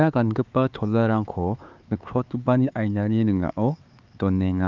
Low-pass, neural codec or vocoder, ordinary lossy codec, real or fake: 7.2 kHz; codec, 16 kHz, 4 kbps, X-Codec, HuBERT features, trained on LibriSpeech; Opus, 24 kbps; fake